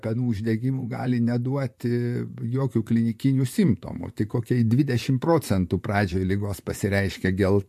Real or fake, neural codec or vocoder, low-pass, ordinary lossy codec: fake; vocoder, 44.1 kHz, 128 mel bands, Pupu-Vocoder; 14.4 kHz; MP3, 64 kbps